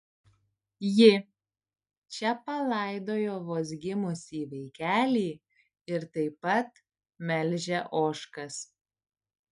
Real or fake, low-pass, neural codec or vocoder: real; 10.8 kHz; none